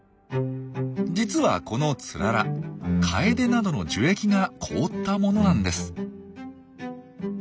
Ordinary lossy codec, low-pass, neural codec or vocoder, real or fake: none; none; none; real